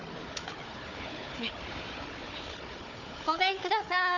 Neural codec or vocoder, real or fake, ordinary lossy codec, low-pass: codec, 16 kHz, 4 kbps, FunCodec, trained on Chinese and English, 50 frames a second; fake; none; 7.2 kHz